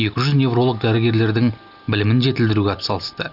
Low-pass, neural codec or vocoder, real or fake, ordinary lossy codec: 5.4 kHz; none; real; none